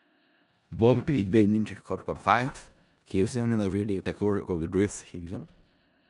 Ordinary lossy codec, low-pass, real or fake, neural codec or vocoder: none; 10.8 kHz; fake; codec, 16 kHz in and 24 kHz out, 0.4 kbps, LongCat-Audio-Codec, four codebook decoder